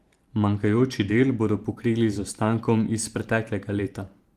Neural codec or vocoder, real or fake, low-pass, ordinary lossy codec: vocoder, 44.1 kHz, 128 mel bands every 512 samples, BigVGAN v2; fake; 14.4 kHz; Opus, 24 kbps